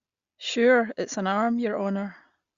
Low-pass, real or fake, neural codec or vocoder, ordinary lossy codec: 7.2 kHz; real; none; Opus, 64 kbps